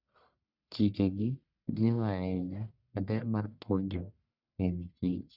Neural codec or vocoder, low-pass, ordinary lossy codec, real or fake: codec, 44.1 kHz, 1.7 kbps, Pupu-Codec; 5.4 kHz; Opus, 64 kbps; fake